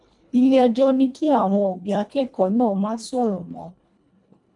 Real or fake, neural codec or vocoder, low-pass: fake; codec, 24 kHz, 1.5 kbps, HILCodec; 10.8 kHz